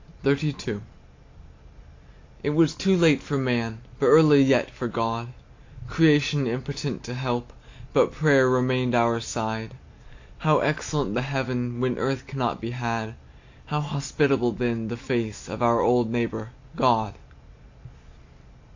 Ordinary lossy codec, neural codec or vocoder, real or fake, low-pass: AAC, 48 kbps; none; real; 7.2 kHz